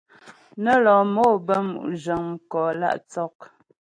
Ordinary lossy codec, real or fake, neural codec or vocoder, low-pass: AAC, 48 kbps; real; none; 9.9 kHz